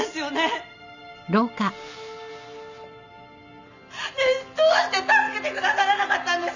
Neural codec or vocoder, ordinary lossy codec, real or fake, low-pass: none; none; real; 7.2 kHz